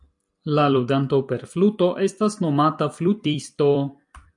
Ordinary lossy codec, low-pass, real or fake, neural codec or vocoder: MP3, 96 kbps; 10.8 kHz; fake; vocoder, 44.1 kHz, 128 mel bands every 256 samples, BigVGAN v2